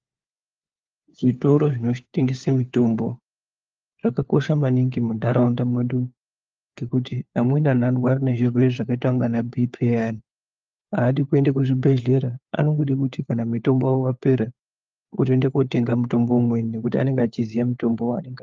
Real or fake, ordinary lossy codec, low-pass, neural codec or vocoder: fake; Opus, 32 kbps; 7.2 kHz; codec, 16 kHz, 4 kbps, FunCodec, trained on LibriTTS, 50 frames a second